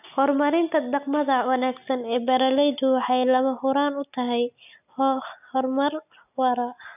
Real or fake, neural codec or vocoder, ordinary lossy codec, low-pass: real; none; none; 3.6 kHz